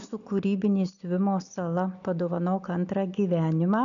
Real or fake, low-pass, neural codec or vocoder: real; 7.2 kHz; none